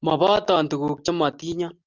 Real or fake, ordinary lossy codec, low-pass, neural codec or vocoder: real; Opus, 24 kbps; 7.2 kHz; none